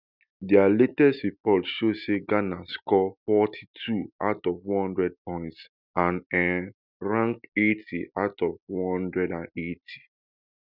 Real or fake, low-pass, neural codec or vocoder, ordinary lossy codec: real; 5.4 kHz; none; none